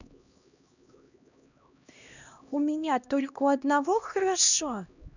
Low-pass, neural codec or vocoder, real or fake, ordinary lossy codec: 7.2 kHz; codec, 16 kHz, 1 kbps, X-Codec, HuBERT features, trained on LibriSpeech; fake; none